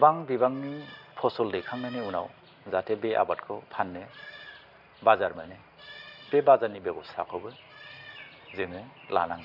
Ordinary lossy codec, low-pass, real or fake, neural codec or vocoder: none; 5.4 kHz; real; none